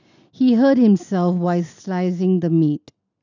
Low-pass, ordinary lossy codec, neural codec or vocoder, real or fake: 7.2 kHz; none; none; real